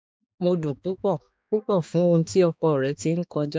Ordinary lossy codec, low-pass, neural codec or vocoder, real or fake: none; none; codec, 16 kHz, 2 kbps, X-Codec, HuBERT features, trained on balanced general audio; fake